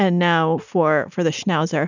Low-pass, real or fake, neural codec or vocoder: 7.2 kHz; real; none